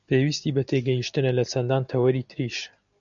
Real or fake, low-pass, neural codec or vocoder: real; 7.2 kHz; none